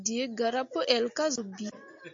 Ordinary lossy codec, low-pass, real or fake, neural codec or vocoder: MP3, 48 kbps; 7.2 kHz; real; none